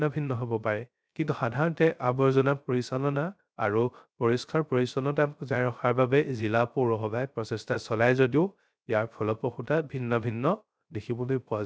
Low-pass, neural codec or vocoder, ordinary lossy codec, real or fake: none; codec, 16 kHz, 0.3 kbps, FocalCodec; none; fake